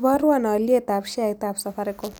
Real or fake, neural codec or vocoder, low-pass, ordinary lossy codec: real; none; none; none